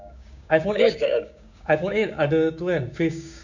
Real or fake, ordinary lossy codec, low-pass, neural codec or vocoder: fake; none; 7.2 kHz; codec, 16 kHz, 6 kbps, DAC